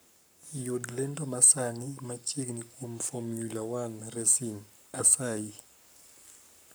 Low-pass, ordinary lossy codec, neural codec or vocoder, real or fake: none; none; codec, 44.1 kHz, 7.8 kbps, Pupu-Codec; fake